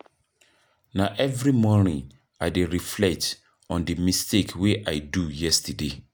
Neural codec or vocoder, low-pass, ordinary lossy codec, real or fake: none; none; none; real